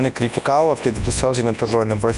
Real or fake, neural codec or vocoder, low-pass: fake; codec, 24 kHz, 0.9 kbps, WavTokenizer, large speech release; 10.8 kHz